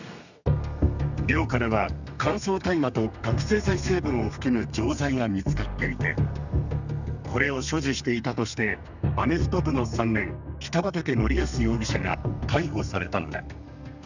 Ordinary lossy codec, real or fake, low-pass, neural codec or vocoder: none; fake; 7.2 kHz; codec, 32 kHz, 1.9 kbps, SNAC